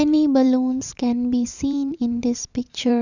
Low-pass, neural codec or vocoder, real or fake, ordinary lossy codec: 7.2 kHz; vocoder, 44.1 kHz, 128 mel bands every 512 samples, BigVGAN v2; fake; none